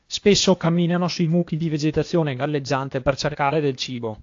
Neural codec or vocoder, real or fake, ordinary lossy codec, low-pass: codec, 16 kHz, 0.8 kbps, ZipCodec; fake; AAC, 48 kbps; 7.2 kHz